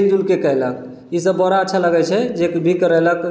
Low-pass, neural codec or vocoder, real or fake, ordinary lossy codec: none; none; real; none